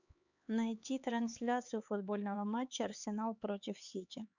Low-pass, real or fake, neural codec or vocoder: 7.2 kHz; fake; codec, 16 kHz, 4 kbps, X-Codec, HuBERT features, trained on LibriSpeech